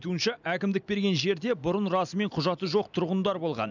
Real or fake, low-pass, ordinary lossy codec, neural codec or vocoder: real; 7.2 kHz; none; none